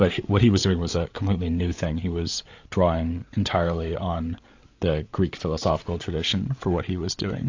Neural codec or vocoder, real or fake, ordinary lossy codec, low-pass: codec, 16 kHz, 8 kbps, FreqCodec, larger model; fake; AAC, 48 kbps; 7.2 kHz